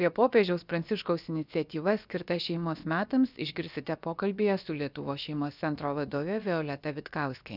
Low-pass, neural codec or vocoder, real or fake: 5.4 kHz; codec, 16 kHz, about 1 kbps, DyCAST, with the encoder's durations; fake